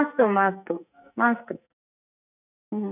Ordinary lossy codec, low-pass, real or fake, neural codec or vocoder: none; 3.6 kHz; fake; codec, 44.1 kHz, 2.6 kbps, SNAC